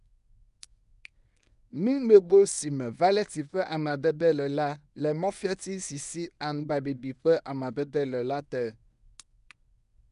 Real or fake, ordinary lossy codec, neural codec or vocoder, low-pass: fake; none; codec, 24 kHz, 0.9 kbps, WavTokenizer, medium speech release version 1; 10.8 kHz